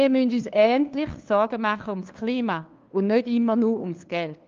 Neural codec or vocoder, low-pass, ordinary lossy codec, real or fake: codec, 16 kHz, 2 kbps, FunCodec, trained on LibriTTS, 25 frames a second; 7.2 kHz; Opus, 24 kbps; fake